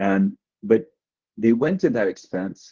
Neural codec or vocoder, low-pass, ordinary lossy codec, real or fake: codec, 24 kHz, 0.9 kbps, WavTokenizer, medium speech release version 1; 7.2 kHz; Opus, 16 kbps; fake